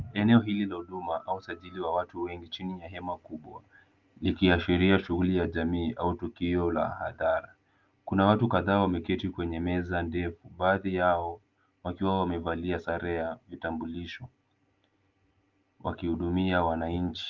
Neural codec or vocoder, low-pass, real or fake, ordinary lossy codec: none; 7.2 kHz; real; Opus, 24 kbps